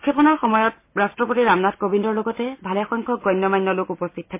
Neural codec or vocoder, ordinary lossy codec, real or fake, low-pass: none; MP3, 24 kbps; real; 3.6 kHz